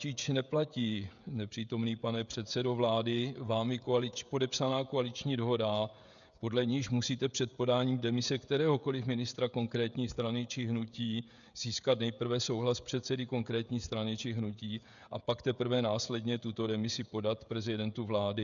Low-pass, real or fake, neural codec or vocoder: 7.2 kHz; fake; codec, 16 kHz, 16 kbps, FreqCodec, smaller model